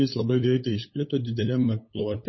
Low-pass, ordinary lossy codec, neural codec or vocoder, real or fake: 7.2 kHz; MP3, 24 kbps; codec, 16 kHz, 8 kbps, FunCodec, trained on LibriTTS, 25 frames a second; fake